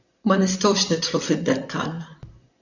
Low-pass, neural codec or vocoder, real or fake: 7.2 kHz; vocoder, 44.1 kHz, 128 mel bands, Pupu-Vocoder; fake